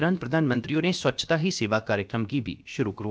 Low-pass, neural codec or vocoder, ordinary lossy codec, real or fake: none; codec, 16 kHz, about 1 kbps, DyCAST, with the encoder's durations; none; fake